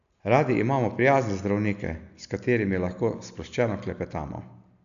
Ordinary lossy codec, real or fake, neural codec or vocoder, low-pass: none; real; none; 7.2 kHz